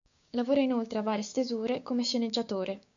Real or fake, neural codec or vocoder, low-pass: fake; codec, 16 kHz, 6 kbps, DAC; 7.2 kHz